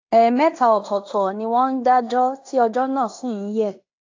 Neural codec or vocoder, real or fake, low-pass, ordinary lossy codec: codec, 16 kHz in and 24 kHz out, 0.9 kbps, LongCat-Audio-Codec, fine tuned four codebook decoder; fake; 7.2 kHz; AAC, 48 kbps